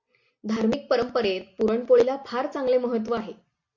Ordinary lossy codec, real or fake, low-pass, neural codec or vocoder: MP3, 48 kbps; real; 7.2 kHz; none